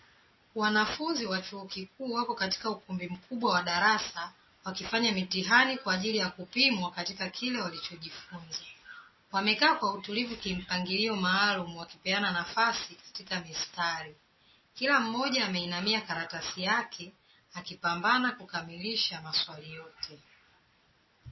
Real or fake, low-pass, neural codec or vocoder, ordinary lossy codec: real; 7.2 kHz; none; MP3, 24 kbps